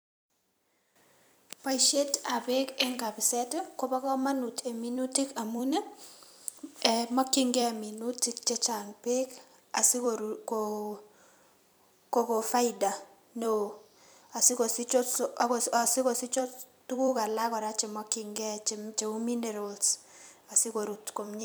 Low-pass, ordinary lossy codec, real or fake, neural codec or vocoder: none; none; fake; vocoder, 44.1 kHz, 128 mel bands every 256 samples, BigVGAN v2